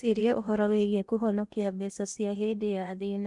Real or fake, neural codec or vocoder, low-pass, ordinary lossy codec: fake; codec, 16 kHz in and 24 kHz out, 0.8 kbps, FocalCodec, streaming, 65536 codes; 10.8 kHz; none